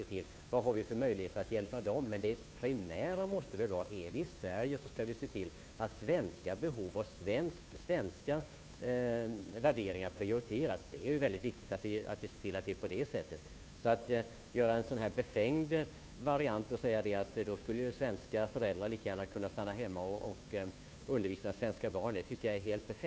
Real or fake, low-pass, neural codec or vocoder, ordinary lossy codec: fake; none; codec, 16 kHz, 2 kbps, FunCodec, trained on Chinese and English, 25 frames a second; none